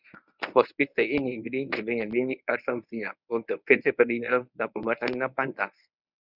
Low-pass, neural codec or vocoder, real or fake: 5.4 kHz; codec, 24 kHz, 0.9 kbps, WavTokenizer, medium speech release version 1; fake